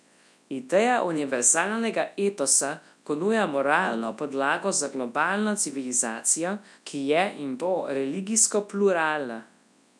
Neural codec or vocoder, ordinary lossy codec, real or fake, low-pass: codec, 24 kHz, 0.9 kbps, WavTokenizer, large speech release; none; fake; none